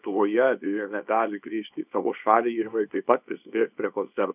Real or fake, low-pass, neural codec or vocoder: fake; 3.6 kHz; codec, 24 kHz, 0.9 kbps, WavTokenizer, small release